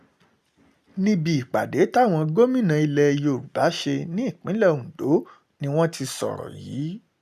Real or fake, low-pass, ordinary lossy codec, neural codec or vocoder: real; 14.4 kHz; none; none